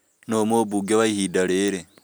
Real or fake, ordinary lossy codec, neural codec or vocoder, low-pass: real; none; none; none